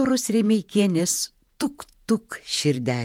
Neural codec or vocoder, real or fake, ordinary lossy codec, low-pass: vocoder, 48 kHz, 128 mel bands, Vocos; fake; MP3, 96 kbps; 19.8 kHz